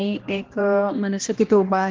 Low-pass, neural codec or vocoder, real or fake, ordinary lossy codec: 7.2 kHz; codec, 16 kHz, 1 kbps, X-Codec, HuBERT features, trained on balanced general audio; fake; Opus, 16 kbps